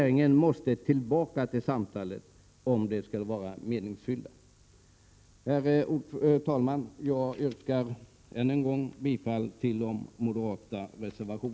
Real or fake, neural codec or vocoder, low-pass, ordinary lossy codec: real; none; none; none